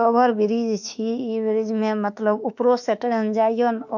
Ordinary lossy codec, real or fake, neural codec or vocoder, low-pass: none; fake; autoencoder, 48 kHz, 32 numbers a frame, DAC-VAE, trained on Japanese speech; 7.2 kHz